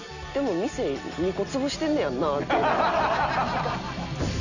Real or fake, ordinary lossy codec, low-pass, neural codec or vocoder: real; none; 7.2 kHz; none